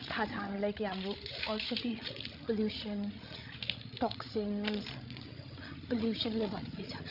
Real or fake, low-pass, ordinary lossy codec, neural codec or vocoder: fake; 5.4 kHz; none; codec, 16 kHz, 16 kbps, FreqCodec, larger model